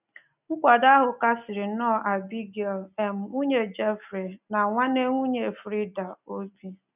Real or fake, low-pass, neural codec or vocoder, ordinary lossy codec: real; 3.6 kHz; none; none